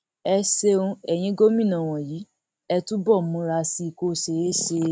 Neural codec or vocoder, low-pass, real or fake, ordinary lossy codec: none; none; real; none